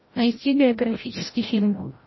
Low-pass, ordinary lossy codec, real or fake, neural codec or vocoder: 7.2 kHz; MP3, 24 kbps; fake; codec, 16 kHz, 0.5 kbps, FreqCodec, larger model